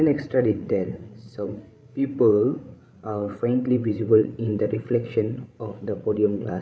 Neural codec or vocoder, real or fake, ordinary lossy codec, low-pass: codec, 16 kHz, 16 kbps, FreqCodec, larger model; fake; none; none